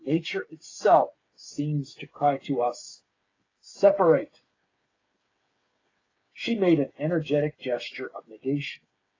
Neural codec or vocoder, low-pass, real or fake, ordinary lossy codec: codec, 16 kHz, 8 kbps, FreqCodec, smaller model; 7.2 kHz; fake; AAC, 32 kbps